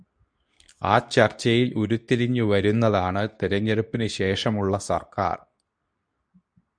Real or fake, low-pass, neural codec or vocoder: fake; 9.9 kHz; codec, 24 kHz, 0.9 kbps, WavTokenizer, medium speech release version 2